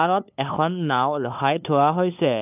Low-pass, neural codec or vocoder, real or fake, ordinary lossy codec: 3.6 kHz; codec, 16 kHz, 2 kbps, FunCodec, trained on LibriTTS, 25 frames a second; fake; none